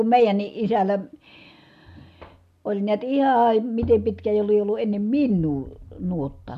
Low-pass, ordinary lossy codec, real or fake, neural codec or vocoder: 14.4 kHz; none; real; none